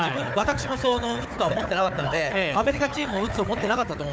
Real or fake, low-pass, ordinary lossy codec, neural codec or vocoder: fake; none; none; codec, 16 kHz, 4 kbps, FunCodec, trained on Chinese and English, 50 frames a second